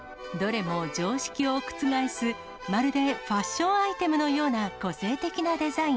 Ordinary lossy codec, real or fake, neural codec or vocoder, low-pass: none; real; none; none